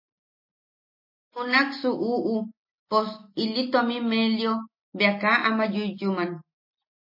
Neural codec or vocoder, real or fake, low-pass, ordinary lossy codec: none; real; 5.4 kHz; MP3, 24 kbps